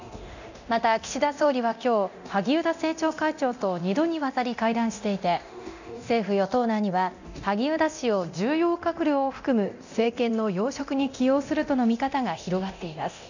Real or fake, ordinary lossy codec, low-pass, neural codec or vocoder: fake; none; 7.2 kHz; codec, 24 kHz, 0.9 kbps, DualCodec